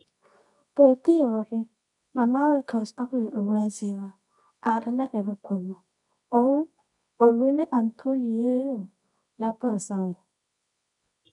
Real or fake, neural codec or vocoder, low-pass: fake; codec, 24 kHz, 0.9 kbps, WavTokenizer, medium music audio release; 10.8 kHz